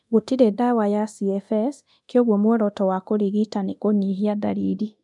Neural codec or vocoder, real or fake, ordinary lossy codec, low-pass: codec, 24 kHz, 0.9 kbps, DualCodec; fake; none; 10.8 kHz